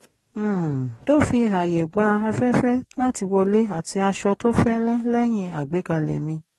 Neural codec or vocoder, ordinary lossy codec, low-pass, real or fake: codec, 44.1 kHz, 2.6 kbps, DAC; AAC, 32 kbps; 19.8 kHz; fake